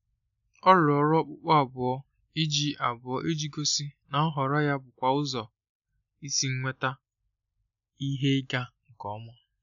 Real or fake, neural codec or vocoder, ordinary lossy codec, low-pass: real; none; none; 7.2 kHz